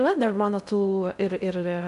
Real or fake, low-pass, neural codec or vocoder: fake; 10.8 kHz; codec, 16 kHz in and 24 kHz out, 0.6 kbps, FocalCodec, streaming, 2048 codes